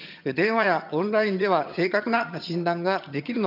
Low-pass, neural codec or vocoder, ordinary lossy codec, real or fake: 5.4 kHz; vocoder, 22.05 kHz, 80 mel bands, HiFi-GAN; none; fake